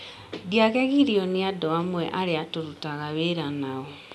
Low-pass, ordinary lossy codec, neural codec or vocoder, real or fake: none; none; none; real